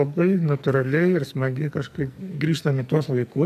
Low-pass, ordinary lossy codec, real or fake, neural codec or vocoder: 14.4 kHz; AAC, 96 kbps; fake; codec, 44.1 kHz, 2.6 kbps, SNAC